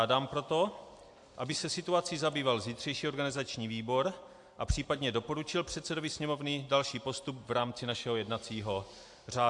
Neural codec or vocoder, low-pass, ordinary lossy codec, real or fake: none; 10.8 kHz; AAC, 64 kbps; real